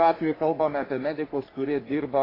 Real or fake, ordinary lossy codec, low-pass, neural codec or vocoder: fake; AAC, 24 kbps; 5.4 kHz; codec, 44.1 kHz, 3.4 kbps, Pupu-Codec